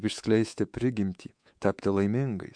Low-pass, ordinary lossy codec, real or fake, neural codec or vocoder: 9.9 kHz; MP3, 64 kbps; fake; codec, 24 kHz, 3.1 kbps, DualCodec